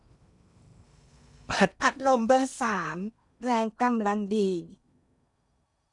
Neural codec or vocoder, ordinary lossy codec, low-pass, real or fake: codec, 16 kHz in and 24 kHz out, 0.8 kbps, FocalCodec, streaming, 65536 codes; none; 10.8 kHz; fake